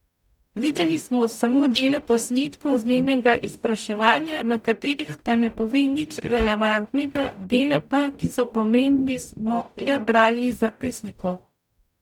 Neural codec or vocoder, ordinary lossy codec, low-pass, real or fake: codec, 44.1 kHz, 0.9 kbps, DAC; none; 19.8 kHz; fake